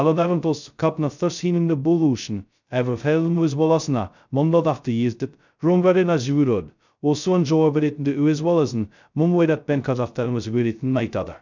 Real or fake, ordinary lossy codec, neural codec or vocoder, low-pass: fake; none; codec, 16 kHz, 0.2 kbps, FocalCodec; 7.2 kHz